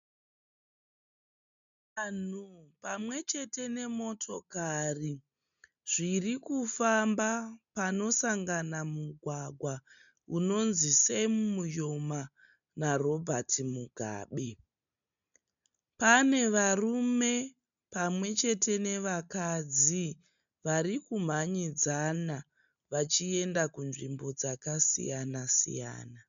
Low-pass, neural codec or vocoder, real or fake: 7.2 kHz; none; real